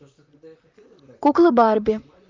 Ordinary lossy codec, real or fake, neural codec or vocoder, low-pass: Opus, 24 kbps; fake; vocoder, 44.1 kHz, 128 mel bands, Pupu-Vocoder; 7.2 kHz